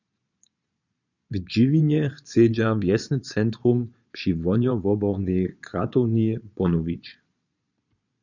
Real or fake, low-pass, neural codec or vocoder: fake; 7.2 kHz; vocoder, 22.05 kHz, 80 mel bands, Vocos